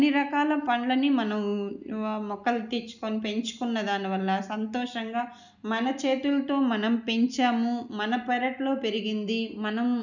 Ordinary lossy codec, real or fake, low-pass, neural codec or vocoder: none; real; 7.2 kHz; none